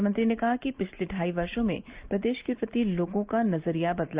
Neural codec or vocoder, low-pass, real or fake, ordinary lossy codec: none; 3.6 kHz; real; Opus, 32 kbps